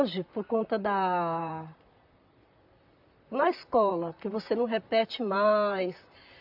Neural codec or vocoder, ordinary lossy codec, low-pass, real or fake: vocoder, 44.1 kHz, 128 mel bands, Pupu-Vocoder; AAC, 48 kbps; 5.4 kHz; fake